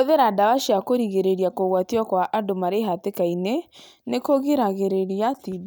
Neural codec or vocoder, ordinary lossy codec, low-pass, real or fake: none; none; none; real